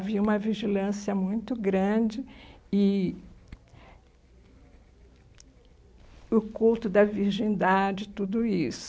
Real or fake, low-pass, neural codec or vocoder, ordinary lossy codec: real; none; none; none